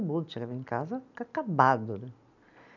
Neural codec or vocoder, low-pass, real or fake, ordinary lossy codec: none; 7.2 kHz; real; none